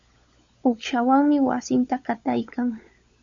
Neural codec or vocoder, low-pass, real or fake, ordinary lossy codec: codec, 16 kHz, 16 kbps, FunCodec, trained on LibriTTS, 50 frames a second; 7.2 kHz; fake; Opus, 64 kbps